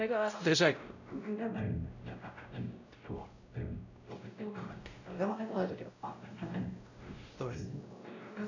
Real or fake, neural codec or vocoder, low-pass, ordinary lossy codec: fake; codec, 16 kHz, 0.5 kbps, X-Codec, WavLM features, trained on Multilingual LibriSpeech; 7.2 kHz; none